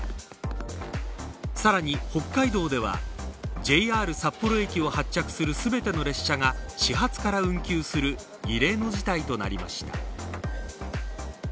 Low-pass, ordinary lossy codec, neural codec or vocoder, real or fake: none; none; none; real